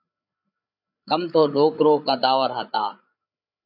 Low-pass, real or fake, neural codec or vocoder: 5.4 kHz; fake; codec, 16 kHz, 8 kbps, FreqCodec, larger model